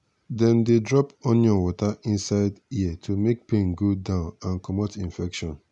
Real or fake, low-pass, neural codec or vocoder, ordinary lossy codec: real; 10.8 kHz; none; none